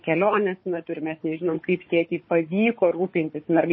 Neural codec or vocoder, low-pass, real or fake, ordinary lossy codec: codec, 16 kHz, 4 kbps, FunCodec, trained on Chinese and English, 50 frames a second; 7.2 kHz; fake; MP3, 24 kbps